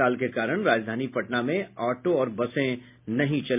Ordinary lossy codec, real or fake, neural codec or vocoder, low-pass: MP3, 32 kbps; real; none; 3.6 kHz